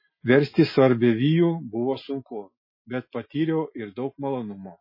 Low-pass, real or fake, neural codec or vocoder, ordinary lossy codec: 5.4 kHz; real; none; MP3, 24 kbps